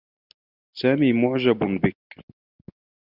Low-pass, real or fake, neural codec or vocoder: 5.4 kHz; real; none